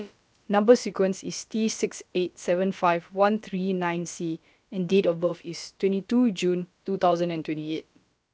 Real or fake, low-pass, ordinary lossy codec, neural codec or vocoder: fake; none; none; codec, 16 kHz, about 1 kbps, DyCAST, with the encoder's durations